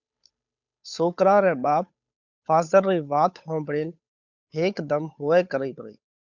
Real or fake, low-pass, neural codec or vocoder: fake; 7.2 kHz; codec, 16 kHz, 8 kbps, FunCodec, trained on Chinese and English, 25 frames a second